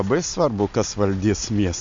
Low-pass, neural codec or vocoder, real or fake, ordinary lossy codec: 7.2 kHz; none; real; MP3, 48 kbps